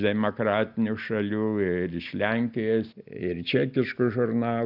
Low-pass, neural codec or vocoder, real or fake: 5.4 kHz; none; real